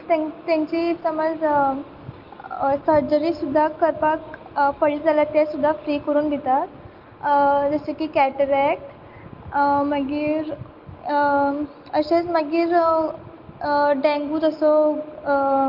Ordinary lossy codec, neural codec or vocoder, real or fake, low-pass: Opus, 32 kbps; none; real; 5.4 kHz